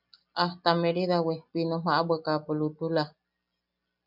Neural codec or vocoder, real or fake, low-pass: none; real; 5.4 kHz